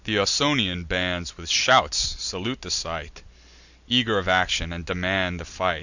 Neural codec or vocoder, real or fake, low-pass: none; real; 7.2 kHz